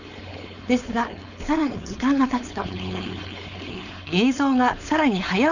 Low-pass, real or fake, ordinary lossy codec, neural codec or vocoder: 7.2 kHz; fake; none; codec, 16 kHz, 4.8 kbps, FACodec